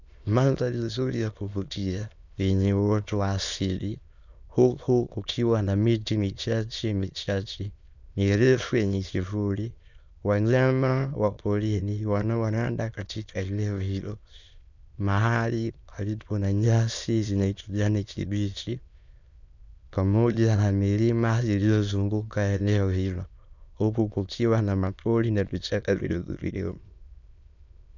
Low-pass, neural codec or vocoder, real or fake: 7.2 kHz; autoencoder, 22.05 kHz, a latent of 192 numbers a frame, VITS, trained on many speakers; fake